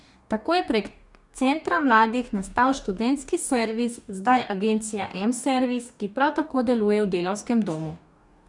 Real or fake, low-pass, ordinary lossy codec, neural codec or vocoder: fake; 10.8 kHz; none; codec, 44.1 kHz, 2.6 kbps, DAC